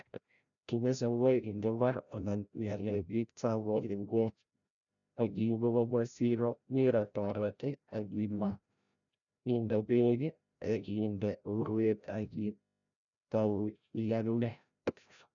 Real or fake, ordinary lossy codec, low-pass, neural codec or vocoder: fake; none; 7.2 kHz; codec, 16 kHz, 0.5 kbps, FreqCodec, larger model